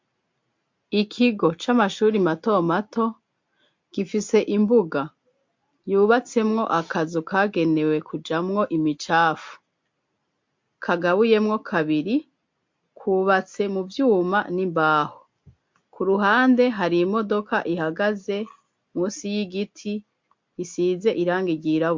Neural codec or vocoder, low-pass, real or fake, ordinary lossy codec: none; 7.2 kHz; real; MP3, 48 kbps